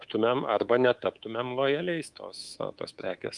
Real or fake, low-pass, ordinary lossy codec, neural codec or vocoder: fake; 10.8 kHz; Opus, 32 kbps; codec, 24 kHz, 3.1 kbps, DualCodec